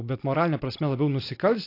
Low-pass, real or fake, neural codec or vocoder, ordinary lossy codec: 5.4 kHz; real; none; AAC, 32 kbps